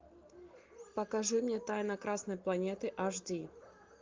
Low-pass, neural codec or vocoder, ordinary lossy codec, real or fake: 7.2 kHz; none; Opus, 32 kbps; real